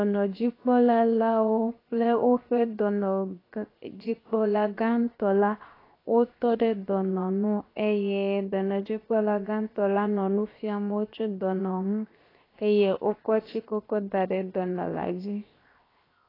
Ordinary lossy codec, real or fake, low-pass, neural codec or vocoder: AAC, 24 kbps; fake; 5.4 kHz; codec, 16 kHz, 0.7 kbps, FocalCodec